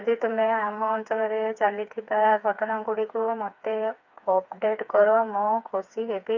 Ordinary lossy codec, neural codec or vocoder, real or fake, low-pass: none; codec, 16 kHz, 4 kbps, FreqCodec, smaller model; fake; 7.2 kHz